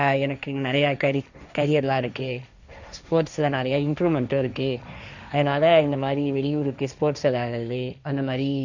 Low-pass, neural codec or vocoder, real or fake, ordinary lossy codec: 7.2 kHz; codec, 16 kHz, 1.1 kbps, Voila-Tokenizer; fake; none